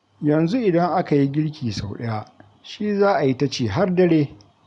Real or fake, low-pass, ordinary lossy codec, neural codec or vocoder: real; 10.8 kHz; none; none